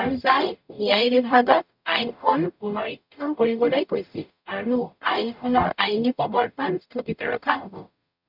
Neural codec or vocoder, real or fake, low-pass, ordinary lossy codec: codec, 44.1 kHz, 0.9 kbps, DAC; fake; 5.4 kHz; none